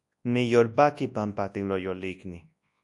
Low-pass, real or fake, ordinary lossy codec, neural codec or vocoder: 10.8 kHz; fake; AAC, 64 kbps; codec, 24 kHz, 0.9 kbps, WavTokenizer, large speech release